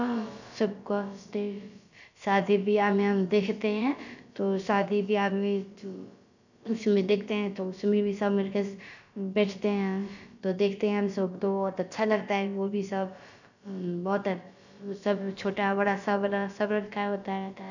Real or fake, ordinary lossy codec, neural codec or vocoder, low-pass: fake; none; codec, 16 kHz, about 1 kbps, DyCAST, with the encoder's durations; 7.2 kHz